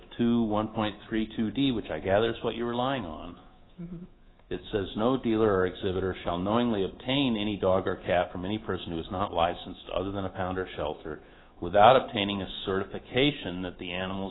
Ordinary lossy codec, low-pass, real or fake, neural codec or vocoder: AAC, 16 kbps; 7.2 kHz; fake; codec, 24 kHz, 3.1 kbps, DualCodec